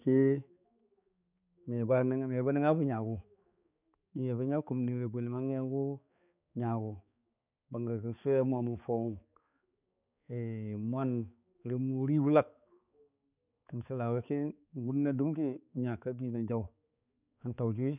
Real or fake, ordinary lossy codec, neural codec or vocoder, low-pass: fake; none; codec, 16 kHz, 4 kbps, X-Codec, HuBERT features, trained on balanced general audio; 3.6 kHz